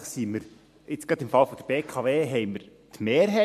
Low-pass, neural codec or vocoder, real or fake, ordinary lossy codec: 14.4 kHz; vocoder, 44.1 kHz, 128 mel bands every 256 samples, BigVGAN v2; fake; MP3, 64 kbps